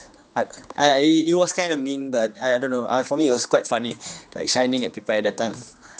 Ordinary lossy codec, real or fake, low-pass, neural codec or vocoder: none; fake; none; codec, 16 kHz, 2 kbps, X-Codec, HuBERT features, trained on general audio